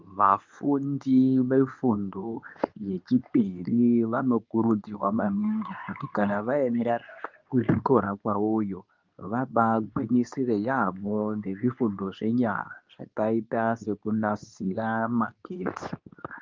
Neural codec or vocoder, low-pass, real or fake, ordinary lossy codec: codec, 24 kHz, 0.9 kbps, WavTokenizer, medium speech release version 2; 7.2 kHz; fake; Opus, 24 kbps